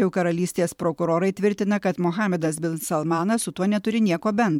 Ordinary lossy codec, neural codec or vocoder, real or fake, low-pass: MP3, 96 kbps; vocoder, 44.1 kHz, 128 mel bands every 512 samples, BigVGAN v2; fake; 19.8 kHz